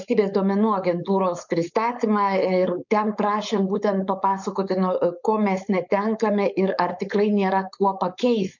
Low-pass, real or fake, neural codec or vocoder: 7.2 kHz; fake; codec, 16 kHz, 4.8 kbps, FACodec